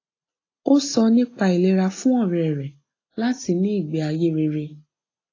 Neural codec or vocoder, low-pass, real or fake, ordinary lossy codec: none; 7.2 kHz; real; AAC, 32 kbps